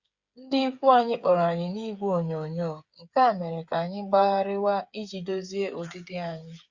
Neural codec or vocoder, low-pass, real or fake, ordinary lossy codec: codec, 16 kHz, 8 kbps, FreqCodec, smaller model; 7.2 kHz; fake; Opus, 64 kbps